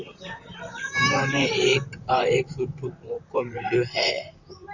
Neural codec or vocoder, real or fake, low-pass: vocoder, 44.1 kHz, 128 mel bands, Pupu-Vocoder; fake; 7.2 kHz